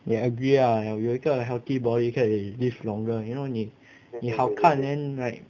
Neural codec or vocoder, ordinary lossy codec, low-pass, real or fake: none; none; 7.2 kHz; real